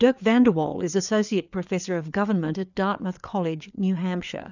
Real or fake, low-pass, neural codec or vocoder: fake; 7.2 kHz; codec, 16 kHz, 4 kbps, FreqCodec, larger model